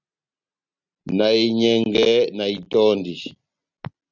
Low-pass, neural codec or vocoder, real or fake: 7.2 kHz; none; real